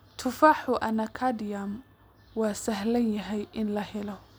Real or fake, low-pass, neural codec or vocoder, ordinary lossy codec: real; none; none; none